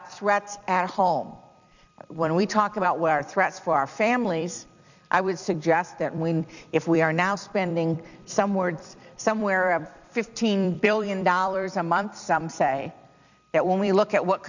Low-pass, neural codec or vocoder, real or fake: 7.2 kHz; vocoder, 44.1 kHz, 128 mel bands every 256 samples, BigVGAN v2; fake